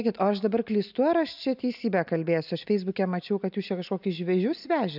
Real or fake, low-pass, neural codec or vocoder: real; 5.4 kHz; none